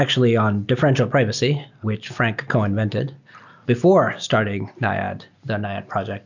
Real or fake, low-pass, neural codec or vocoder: real; 7.2 kHz; none